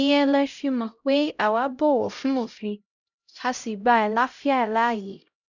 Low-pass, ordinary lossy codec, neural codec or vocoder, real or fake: 7.2 kHz; none; codec, 16 kHz, 0.5 kbps, X-Codec, HuBERT features, trained on LibriSpeech; fake